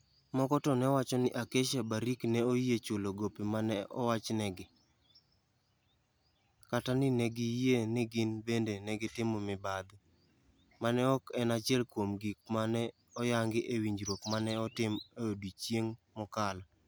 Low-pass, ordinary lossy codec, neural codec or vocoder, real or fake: none; none; none; real